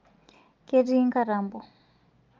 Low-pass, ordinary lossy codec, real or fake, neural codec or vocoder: 7.2 kHz; Opus, 24 kbps; real; none